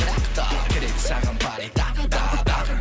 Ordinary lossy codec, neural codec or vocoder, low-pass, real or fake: none; none; none; real